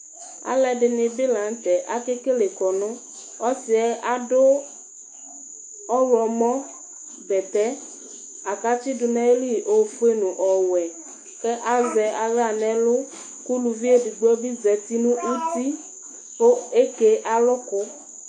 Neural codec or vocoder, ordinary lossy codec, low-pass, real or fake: autoencoder, 48 kHz, 128 numbers a frame, DAC-VAE, trained on Japanese speech; AAC, 64 kbps; 9.9 kHz; fake